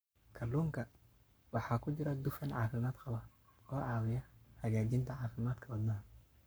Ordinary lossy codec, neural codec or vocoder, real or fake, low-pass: none; codec, 44.1 kHz, 7.8 kbps, Pupu-Codec; fake; none